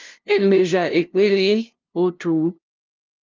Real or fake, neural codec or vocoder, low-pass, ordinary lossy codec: fake; codec, 16 kHz, 0.5 kbps, FunCodec, trained on LibriTTS, 25 frames a second; 7.2 kHz; Opus, 24 kbps